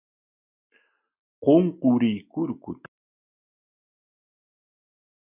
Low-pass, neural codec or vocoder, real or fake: 3.6 kHz; none; real